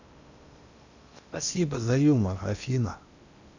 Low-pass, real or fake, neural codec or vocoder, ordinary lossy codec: 7.2 kHz; fake; codec, 16 kHz in and 24 kHz out, 0.8 kbps, FocalCodec, streaming, 65536 codes; none